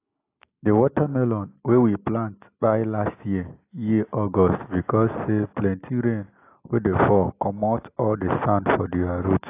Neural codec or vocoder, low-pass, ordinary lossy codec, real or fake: none; 3.6 kHz; AAC, 24 kbps; real